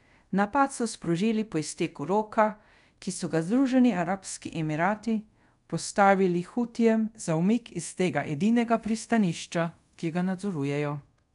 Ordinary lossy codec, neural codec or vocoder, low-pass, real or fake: none; codec, 24 kHz, 0.5 kbps, DualCodec; 10.8 kHz; fake